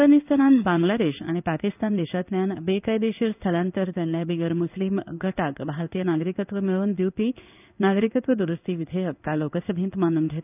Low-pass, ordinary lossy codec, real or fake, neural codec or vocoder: 3.6 kHz; none; fake; codec, 16 kHz in and 24 kHz out, 1 kbps, XY-Tokenizer